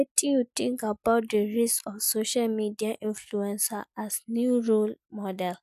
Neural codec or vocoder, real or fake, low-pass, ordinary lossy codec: none; real; 14.4 kHz; none